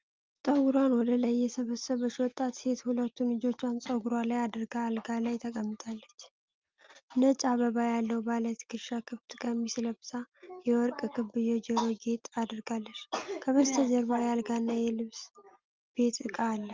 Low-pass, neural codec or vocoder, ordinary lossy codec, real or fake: 7.2 kHz; none; Opus, 32 kbps; real